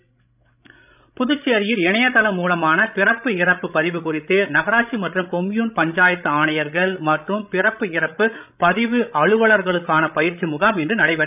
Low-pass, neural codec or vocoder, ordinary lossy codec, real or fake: 3.6 kHz; codec, 16 kHz, 16 kbps, FreqCodec, larger model; none; fake